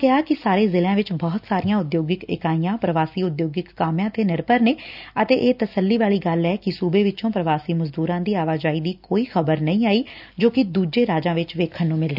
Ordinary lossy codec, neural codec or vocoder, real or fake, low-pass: none; none; real; 5.4 kHz